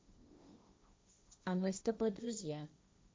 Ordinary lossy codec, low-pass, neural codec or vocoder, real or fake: none; none; codec, 16 kHz, 1.1 kbps, Voila-Tokenizer; fake